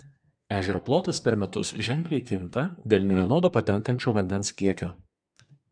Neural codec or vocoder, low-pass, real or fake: codec, 24 kHz, 1 kbps, SNAC; 9.9 kHz; fake